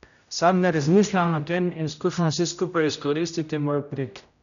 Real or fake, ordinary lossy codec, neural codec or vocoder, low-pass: fake; none; codec, 16 kHz, 0.5 kbps, X-Codec, HuBERT features, trained on general audio; 7.2 kHz